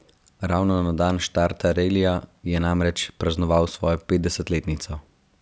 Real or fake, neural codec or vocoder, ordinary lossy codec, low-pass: real; none; none; none